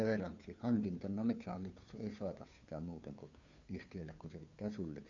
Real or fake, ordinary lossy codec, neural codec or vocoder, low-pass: fake; none; codec, 16 kHz, 2 kbps, FunCodec, trained on Chinese and English, 25 frames a second; 7.2 kHz